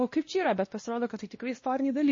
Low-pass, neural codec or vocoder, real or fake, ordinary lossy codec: 7.2 kHz; codec, 16 kHz, 1 kbps, X-Codec, WavLM features, trained on Multilingual LibriSpeech; fake; MP3, 32 kbps